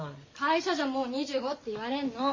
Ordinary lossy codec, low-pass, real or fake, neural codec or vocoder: MP3, 32 kbps; 7.2 kHz; real; none